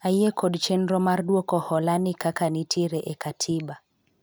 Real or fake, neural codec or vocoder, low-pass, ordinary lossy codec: real; none; none; none